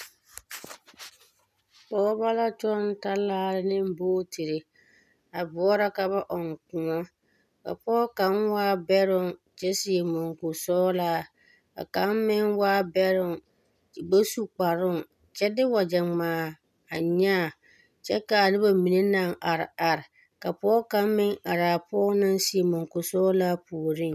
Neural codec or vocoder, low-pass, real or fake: none; 14.4 kHz; real